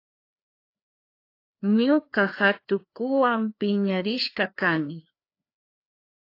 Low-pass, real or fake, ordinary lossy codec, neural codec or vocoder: 5.4 kHz; fake; AAC, 32 kbps; codec, 16 kHz, 2 kbps, FreqCodec, larger model